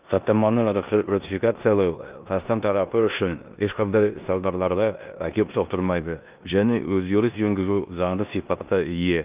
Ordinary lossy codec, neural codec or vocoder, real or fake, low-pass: Opus, 24 kbps; codec, 16 kHz in and 24 kHz out, 0.9 kbps, LongCat-Audio-Codec, four codebook decoder; fake; 3.6 kHz